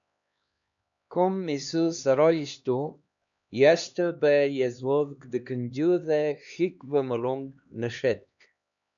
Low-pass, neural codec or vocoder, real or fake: 7.2 kHz; codec, 16 kHz, 2 kbps, X-Codec, HuBERT features, trained on LibriSpeech; fake